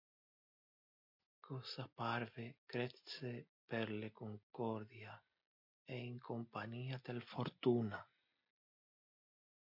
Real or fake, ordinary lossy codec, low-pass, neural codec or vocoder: real; MP3, 48 kbps; 5.4 kHz; none